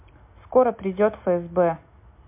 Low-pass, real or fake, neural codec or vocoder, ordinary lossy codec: 3.6 kHz; real; none; MP3, 32 kbps